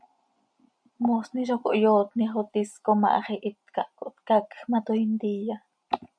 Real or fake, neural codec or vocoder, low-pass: real; none; 9.9 kHz